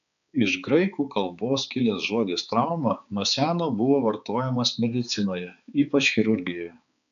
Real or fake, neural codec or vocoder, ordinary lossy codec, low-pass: fake; codec, 16 kHz, 4 kbps, X-Codec, HuBERT features, trained on balanced general audio; AAC, 96 kbps; 7.2 kHz